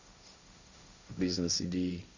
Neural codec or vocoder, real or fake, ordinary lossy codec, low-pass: codec, 16 kHz, 1.1 kbps, Voila-Tokenizer; fake; Opus, 64 kbps; 7.2 kHz